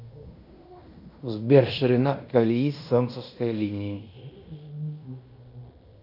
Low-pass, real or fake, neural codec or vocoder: 5.4 kHz; fake; codec, 16 kHz in and 24 kHz out, 0.9 kbps, LongCat-Audio-Codec, fine tuned four codebook decoder